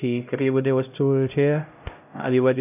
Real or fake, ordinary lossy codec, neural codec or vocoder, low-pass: fake; none; codec, 16 kHz, 0.5 kbps, X-Codec, HuBERT features, trained on LibriSpeech; 3.6 kHz